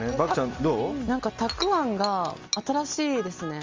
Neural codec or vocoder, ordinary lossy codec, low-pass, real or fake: none; Opus, 32 kbps; 7.2 kHz; real